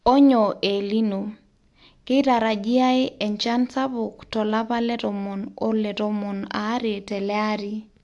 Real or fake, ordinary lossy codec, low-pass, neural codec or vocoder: real; AAC, 64 kbps; 10.8 kHz; none